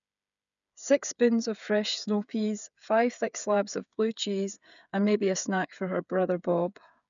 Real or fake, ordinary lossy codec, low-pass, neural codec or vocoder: fake; none; 7.2 kHz; codec, 16 kHz, 8 kbps, FreqCodec, smaller model